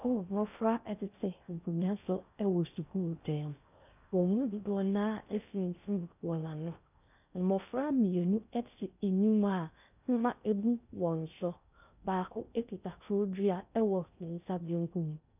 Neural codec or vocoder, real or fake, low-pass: codec, 16 kHz in and 24 kHz out, 0.6 kbps, FocalCodec, streaming, 4096 codes; fake; 3.6 kHz